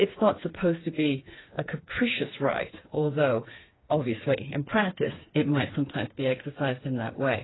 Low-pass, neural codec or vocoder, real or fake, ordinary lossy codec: 7.2 kHz; codec, 16 kHz, 4 kbps, FreqCodec, smaller model; fake; AAC, 16 kbps